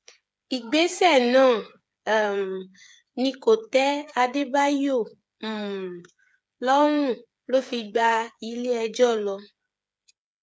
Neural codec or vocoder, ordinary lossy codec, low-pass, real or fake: codec, 16 kHz, 8 kbps, FreqCodec, smaller model; none; none; fake